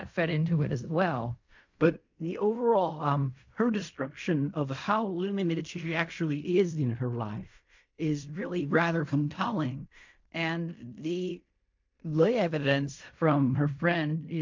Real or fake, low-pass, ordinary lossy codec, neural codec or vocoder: fake; 7.2 kHz; MP3, 48 kbps; codec, 16 kHz in and 24 kHz out, 0.4 kbps, LongCat-Audio-Codec, fine tuned four codebook decoder